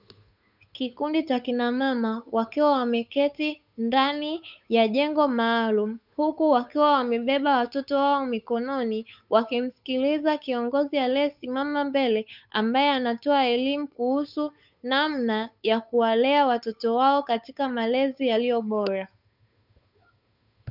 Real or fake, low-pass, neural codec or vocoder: fake; 5.4 kHz; codec, 16 kHz, 8 kbps, FunCodec, trained on Chinese and English, 25 frames a second